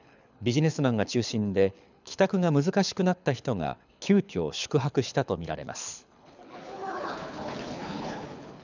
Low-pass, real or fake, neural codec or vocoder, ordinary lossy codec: 7.2 kHz; fake; codec, 24 kHz, 6 kbps, HILCodec; none